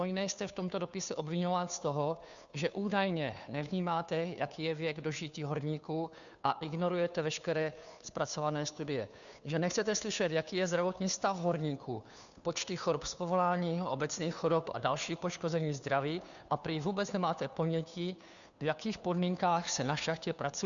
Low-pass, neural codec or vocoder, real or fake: 7.2 kHz; codec, 16 kHz, 2 kbps, FunCodec, trained on Chinese and English, 25 frames a second; fake